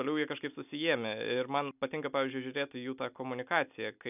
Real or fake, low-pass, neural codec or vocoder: real; 3.6 kHz; none